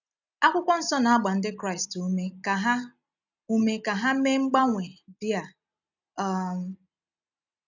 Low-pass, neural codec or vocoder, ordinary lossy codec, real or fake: 7.2 kHz; none; none; real